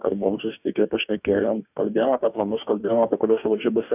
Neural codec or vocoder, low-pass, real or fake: codec, 44.1 kHz, 2.6 kbps, DAC; 3.6 kHz; fake